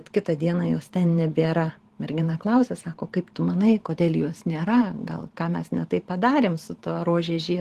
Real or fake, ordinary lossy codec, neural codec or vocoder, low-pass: fake; Opus, 16 kbps; vocoder, 44.1 kHz, 128 mel bands every 512 samples, BigVGAN v2; 14.4 kHz